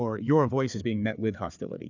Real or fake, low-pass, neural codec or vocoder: fake; 7.2 kHz; codec, 16 kHz, 4 kbps, FreqCodec, larger model